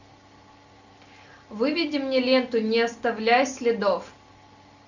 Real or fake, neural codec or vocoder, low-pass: real; none; 7.2 kHz